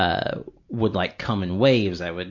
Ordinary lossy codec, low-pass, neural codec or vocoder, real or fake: MP3, 64 kbps; 7.2 kHz; none; real